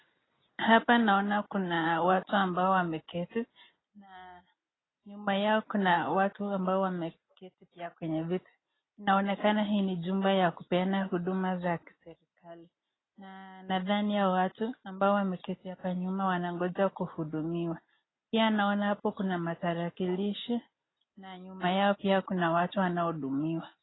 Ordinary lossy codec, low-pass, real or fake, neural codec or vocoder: AAC, 16 kbps; 7.2 kHz; real; none